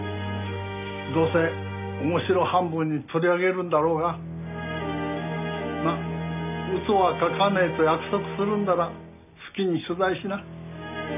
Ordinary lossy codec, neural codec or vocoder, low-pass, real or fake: none; none; 3.6 kHz; real